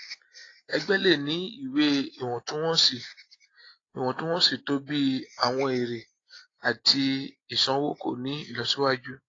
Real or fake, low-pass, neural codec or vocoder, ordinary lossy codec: real; 7.2 kHz; none; AAC, 32 kbps